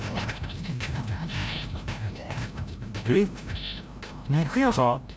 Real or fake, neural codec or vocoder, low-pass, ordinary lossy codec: fake; codec, 16 kHz, 0.5 kbps, FreqCodec, larger model; none; none